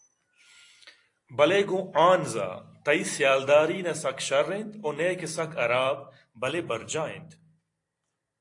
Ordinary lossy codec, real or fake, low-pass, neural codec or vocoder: AAC, 48 kbps; fake; 10.8 kHz; vocoder, 44.1 kHz, 128 mel bands every 256 samples, BigVGAN v2